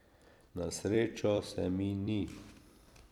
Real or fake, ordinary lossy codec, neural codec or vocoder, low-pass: fake; none; vocoder, 44.1 kHz, 128 mel bands every 256 samples, BigVGAN v2; 19.8 kHz